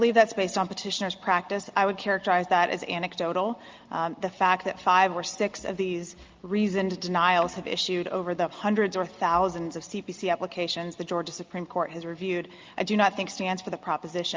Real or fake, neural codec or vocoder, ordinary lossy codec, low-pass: real; none; Opus, 32 kbps; 7.2 kHz